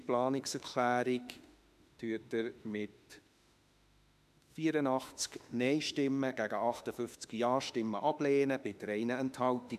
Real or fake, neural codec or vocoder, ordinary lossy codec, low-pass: fake; autoencoder, 48 kHz, 32 numbers a frame, DAC-VAE, trained on Japanese speech; none; 14.4 kHz